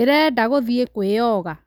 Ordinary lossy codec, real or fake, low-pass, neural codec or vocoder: none; real; none; none